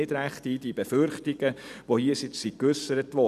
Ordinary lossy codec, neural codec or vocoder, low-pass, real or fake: none; vocoder, 44.1 kHz, 128 mel bands every 256 samples, BigVGAN v2; 14.4 kHz; fake